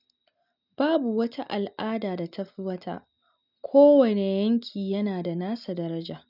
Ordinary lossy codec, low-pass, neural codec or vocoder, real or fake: none; 5.4 kHz; none; real